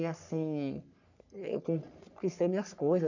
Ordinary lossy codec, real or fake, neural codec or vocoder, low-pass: none; fake; codec, 44.1 kHz, 3.4 kbps, Pupu-Codec; 7.2 kHz